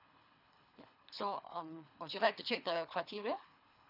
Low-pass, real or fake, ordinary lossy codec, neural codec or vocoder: 5.4 kHz; fake; none; codec, 24 kHz, 3 kbps, HILCodec